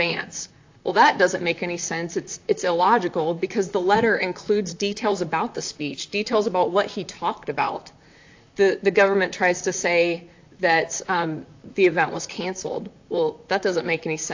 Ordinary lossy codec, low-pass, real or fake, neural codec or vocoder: AAC, 48 kbps; 7.2 kHz; fake; vocoder, 44.1 kHz, 128 mel bands, Pupu-Vocoder